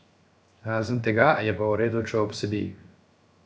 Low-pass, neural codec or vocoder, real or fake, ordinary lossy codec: none; codec, 16 kHz, 0.7 kbps, FocalCodec; fake; none